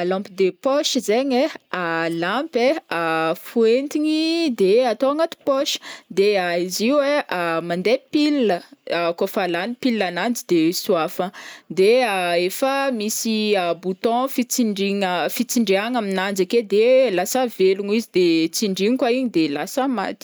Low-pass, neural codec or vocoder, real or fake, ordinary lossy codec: none; none; real; none